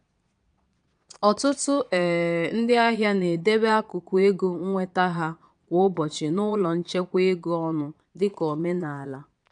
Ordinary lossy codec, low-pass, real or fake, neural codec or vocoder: none; 9.9 kHz; fake; vocoder, 22.05 kHz, 80 mel bands, Vocos